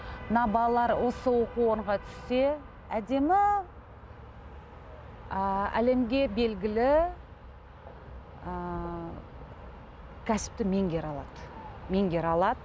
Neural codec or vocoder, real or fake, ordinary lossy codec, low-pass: none; real; none; none